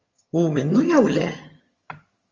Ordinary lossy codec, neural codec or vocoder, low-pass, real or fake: Opus, 32 kbps; vocoder, 22.05 kHz, 80 mel bands, HiFi-GAN; 7.2 kHz; fake